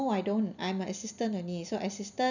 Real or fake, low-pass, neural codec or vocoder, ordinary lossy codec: real; 7.2 kHz; none; none